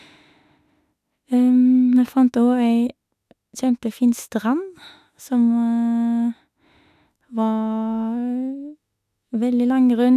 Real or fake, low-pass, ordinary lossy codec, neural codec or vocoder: fake; 14.4 kHz; none; autoencoder, 48 kHz, 32 numbers a frame, DAC-VAE, trained on Japanese speech